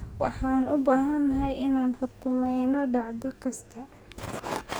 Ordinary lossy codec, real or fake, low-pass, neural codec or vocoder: none; fake; none; codec, 44.1 kHz, 2.6 kbps, DAC